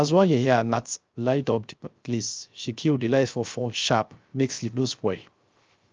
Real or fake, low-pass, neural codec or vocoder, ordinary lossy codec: fake; 7.2 kHz; codec, 16 kHz, 0.3 kbps, FocalCodec; Opus, 24 kbps